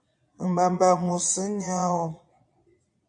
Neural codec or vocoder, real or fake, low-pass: vocoder, 22.05 kHz, 80 mel bands, Vocos; fake; 9.9 kHz